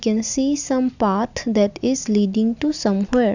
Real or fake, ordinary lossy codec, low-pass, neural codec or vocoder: real; none; 7.2 kHz; none